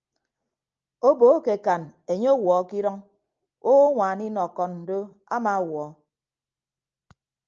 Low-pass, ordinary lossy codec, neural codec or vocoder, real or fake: 7.2 kHz; Opus, 24 kbps; none; real